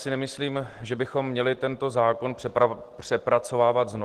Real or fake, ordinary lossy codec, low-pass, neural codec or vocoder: real; Opus, 16 kbps; 14.4 kHz; none